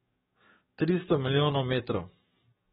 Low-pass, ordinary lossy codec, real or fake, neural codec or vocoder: 19.8 kHz; AAC, 16 kbps; fake; codec, 44.1 kHz, 7.8 kbps, DAC